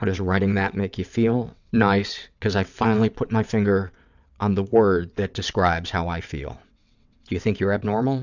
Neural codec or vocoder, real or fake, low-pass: vocoder, 22.05 kHz, 80 mel bands, WaveNeXt; fake; 7.2 kHz